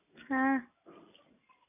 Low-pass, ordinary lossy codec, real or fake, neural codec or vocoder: 3.6 kHz; none; real; none